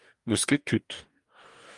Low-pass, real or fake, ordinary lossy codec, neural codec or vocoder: 10.8 kHz; fake; Opus, 32 kbps; codec, 44.1 kHz, 2.6 kbps, DAC